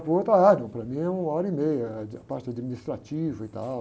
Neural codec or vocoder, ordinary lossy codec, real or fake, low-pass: none; none; real; none